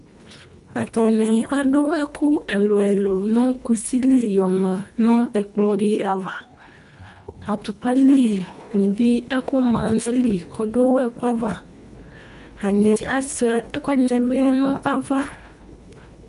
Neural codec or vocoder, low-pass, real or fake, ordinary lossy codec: codec, 24 kHz, 1.5 kbps, HILCodec; 10.8 kHz; fake; AAC, 96 kbps